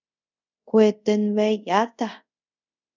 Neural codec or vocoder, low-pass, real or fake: codec, 24 kHz, 0.5 kbps, DualCodec; 7.2 kHz; fake